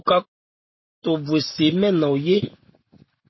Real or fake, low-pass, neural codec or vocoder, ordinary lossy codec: real; 7.2 kHz; none; MP3, 24 kbps